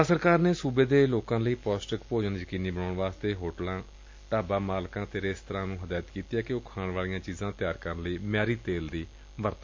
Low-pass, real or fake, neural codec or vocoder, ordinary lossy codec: 7.2 kHz; real; none; MP3, 48 kbps